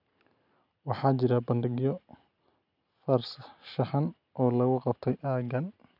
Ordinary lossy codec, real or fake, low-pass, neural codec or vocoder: none; real; 5.4 kHz; none